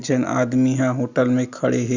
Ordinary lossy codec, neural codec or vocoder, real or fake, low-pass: Opus, 64 kbps; none; real; 7.2 kHz